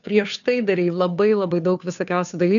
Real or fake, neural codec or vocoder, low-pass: fake; codec, 16 kHz, 2 kbps, FunCodec, trained on Chinese and English, 25 frames a second; 7.2 kHz